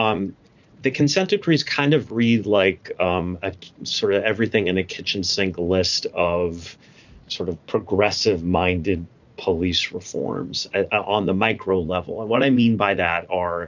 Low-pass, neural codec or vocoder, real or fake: 7.2 kHz; vocoder, 44.1 kHz, 80 mel bands, Vocos; fake